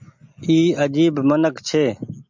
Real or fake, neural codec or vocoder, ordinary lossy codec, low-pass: real; none; MP3, 64 kbps; 7.2 kHz